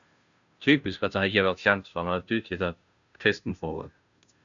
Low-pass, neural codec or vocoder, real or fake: 7.2 kHz; codec, 16 kHz, 0.5 kbps, FunCodec, trained on Chinese and English, 25 frames a second; fake